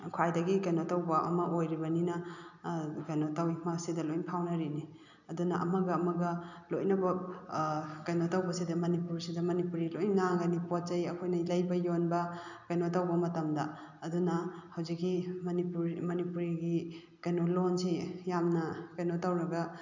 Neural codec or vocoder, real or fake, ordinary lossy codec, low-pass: none; real; none; 7.2 kHz